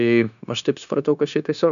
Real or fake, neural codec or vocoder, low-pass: fake; codec, 16 kHz, 0.9 kbps, LongCat-Audio-Codec; 7.2 kHz